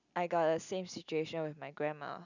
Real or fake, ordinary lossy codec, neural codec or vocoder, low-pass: real; none; none; 7.2 kHz